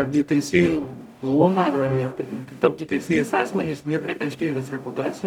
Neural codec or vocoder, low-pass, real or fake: codec, 44.1 kHz, 0.9 kbps, DAC; 19.8 kHz; fake